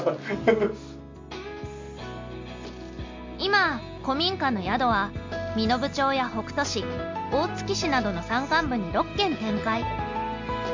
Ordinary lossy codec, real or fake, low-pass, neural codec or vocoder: MP3, 48 kbps; real; 7.2 kHz; none